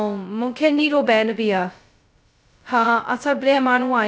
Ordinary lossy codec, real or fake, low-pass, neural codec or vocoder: none; fake; none; codec, 16 kHz, 0.2 kbps, FocalCodec